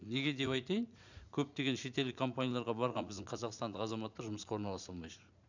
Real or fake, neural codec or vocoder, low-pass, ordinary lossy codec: fake; vocoder, 44.1 kHz, 80 mel bands, Vocos; 7.2 kHz; none